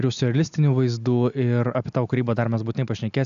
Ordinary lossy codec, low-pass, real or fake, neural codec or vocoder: AAC, 96 kbps; 7.2 kHz; real; none